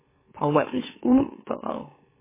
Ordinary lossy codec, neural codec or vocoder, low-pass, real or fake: MP3, 16 kbps; autoencoder, 44.1 kHz, a latent of 192 numbers a frame, MeloTTS; 3.6 kHz; fake